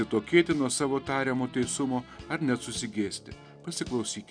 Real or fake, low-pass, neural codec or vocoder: real; 9.9 kHz; none